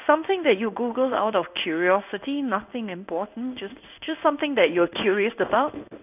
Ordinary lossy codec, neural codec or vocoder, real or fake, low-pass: none; codec, 16 kHz in and 24 kHz out, 1 kbps, XY-Tokenizer; fake; 3.6 kHz